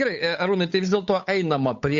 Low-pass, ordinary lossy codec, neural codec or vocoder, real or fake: 7.2 kHz; AAC, 64 kbps; codec, 16 kHz, 8 kbps, FunCodec, trained on Chinese and English, 25 frames a second; fake